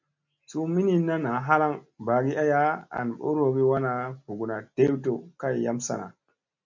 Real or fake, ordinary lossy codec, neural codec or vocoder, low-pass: real; MP3, 64 kbps; none; 7.2 kHz